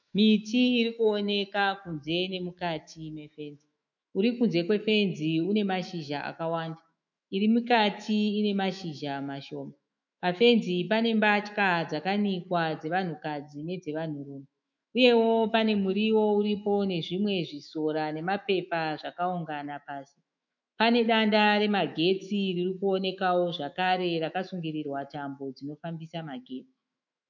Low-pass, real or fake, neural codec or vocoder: 7.2 kHz; fake; autoencoder, 48 kHz, 128 numbers a frame, DAC-VAE, trained on Japanese speech